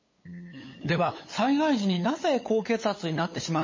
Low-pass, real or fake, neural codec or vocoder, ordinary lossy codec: 7.2 kHz; fake; codec, 16 kHz, 8 kbps, FunCodec, trained on LibriTTS, 25 frames a second; MP3, 32 kbps